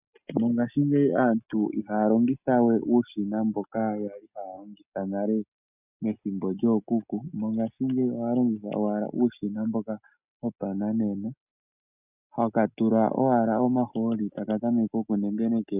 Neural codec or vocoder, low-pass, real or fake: none; 3.6 kHz; real